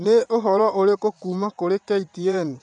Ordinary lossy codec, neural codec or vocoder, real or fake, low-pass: none; vocoder, 22.05 kHz, 80 mel bands, WaveNeXt; fake; 9.9 kHz